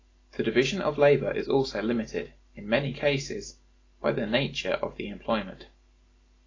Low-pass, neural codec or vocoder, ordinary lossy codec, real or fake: 7.2 kHz; none; AAC, 32 kbps; real